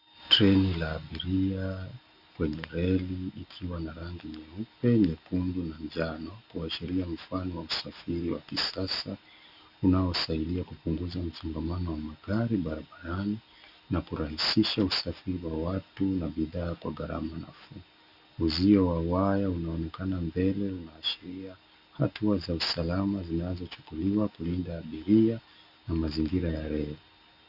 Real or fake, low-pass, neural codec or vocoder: real; 5.4 kHz; none